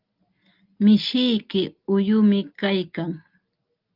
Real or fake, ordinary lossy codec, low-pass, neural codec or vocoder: real; Opus, 24 kbps; 5.4 kHz; none